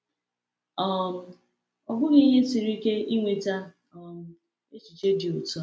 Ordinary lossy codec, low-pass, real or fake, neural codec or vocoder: none; none; real; none